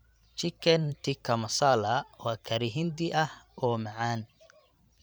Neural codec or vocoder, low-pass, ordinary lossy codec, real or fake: vocoder, 44.1 kHz, 128 mel bands every 512 samples, BigVGAN v2; none; none; fake